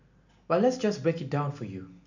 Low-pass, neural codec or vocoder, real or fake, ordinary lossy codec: 7.2 kHz; none; real; none